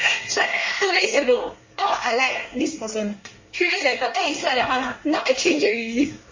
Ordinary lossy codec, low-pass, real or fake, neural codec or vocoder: MP3, 32 kbps; 7.2 kHz; fake; codec, 24 kHz, 1 kbps, SNAC